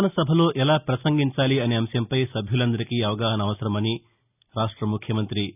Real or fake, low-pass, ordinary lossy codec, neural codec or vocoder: real; 3.6 kHz; none; none